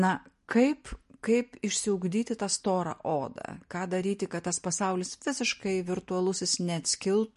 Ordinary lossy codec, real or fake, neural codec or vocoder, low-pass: MP3, 48 kbps; real; none; 14.4 kHz